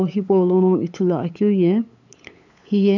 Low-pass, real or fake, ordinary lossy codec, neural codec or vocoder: 7.2 kHz; fake; none; codec, 16 kHz, 8 kbps, FunCodec, trained on LibriTTS, 25 frames a second